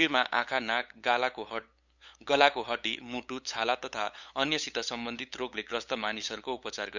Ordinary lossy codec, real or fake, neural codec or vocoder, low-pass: none; fake; codec, 16 kHz, 8 kbps, FunCodec, trained on LibriTTS, 25 frames a second; 7.2 kHz